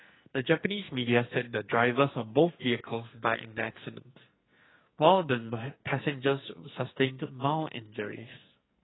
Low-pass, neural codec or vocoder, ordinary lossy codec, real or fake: 7.2 kHz; codec, 44.1 kHz, 2.6 kbps, SNAC; AAC, 16 kbps; fake